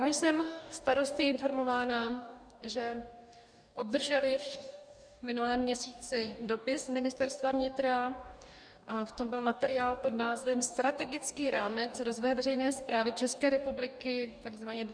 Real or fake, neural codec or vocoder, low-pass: fake; codec, 44.1 kHz, 2.6 kbps, DAC; 9.9 kHz